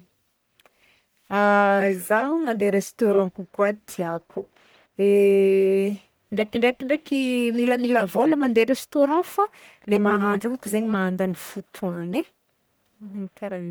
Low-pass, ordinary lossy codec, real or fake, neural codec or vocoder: none; none; fake; codec, 44.1 kHz, 1.7 kbps, Pupu-Codec